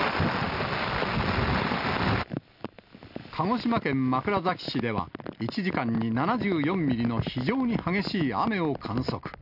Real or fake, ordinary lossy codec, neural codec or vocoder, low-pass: real; none; none; 5.4 kHz